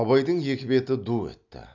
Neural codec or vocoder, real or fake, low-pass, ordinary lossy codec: none; real; 7.2 kHz; none